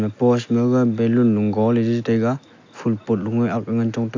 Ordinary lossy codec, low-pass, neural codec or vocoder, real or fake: AAC, 48 kbps; 7.2 kHz; none; real